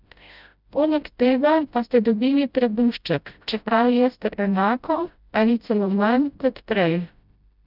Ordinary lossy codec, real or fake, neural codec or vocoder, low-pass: none; fake; codec, 16 kHz, 0.5 kbps, FreqCodec, smaller model; 5.4 kHz